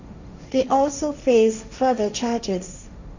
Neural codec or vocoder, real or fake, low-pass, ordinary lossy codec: codec, 16 kHz, 1.1 kbps, Voila-Tokenizer; fake; 7.2 kHz; none